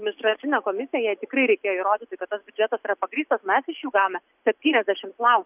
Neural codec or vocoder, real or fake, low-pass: none; real; 3.6 kHz